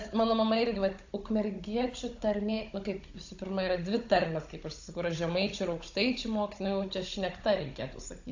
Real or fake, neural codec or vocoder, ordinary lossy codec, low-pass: fake; codec, 16 kHz, 16 kbps, FunCodec, trained on Chinese and English, 50 frames a second; AAC, 48 kbps; 7.2 kHz